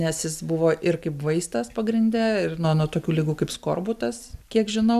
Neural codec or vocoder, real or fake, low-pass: none; real; 14.4 kHz